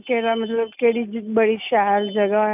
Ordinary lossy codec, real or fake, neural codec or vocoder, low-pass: none; real; none; 3.6 kHz